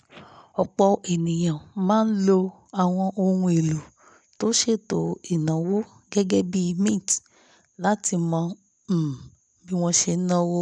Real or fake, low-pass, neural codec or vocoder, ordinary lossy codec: real; 9.9 kHz; none; none